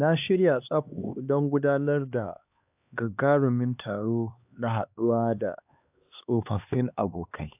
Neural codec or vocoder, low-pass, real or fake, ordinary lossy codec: codec, 16 kHz, 2 kbps, X-Codec, HuBERT features, trained on LibriSpeech; 3.6 kHz; fake; AAC, 32 kbps